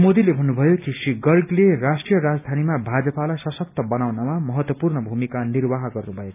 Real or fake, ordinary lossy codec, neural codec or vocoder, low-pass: real; none; none; 3.6 kHz